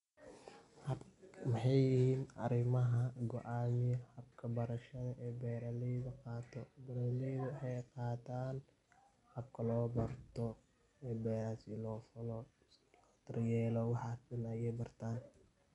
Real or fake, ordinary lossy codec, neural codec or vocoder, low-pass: real; none; none; 10.8 kHz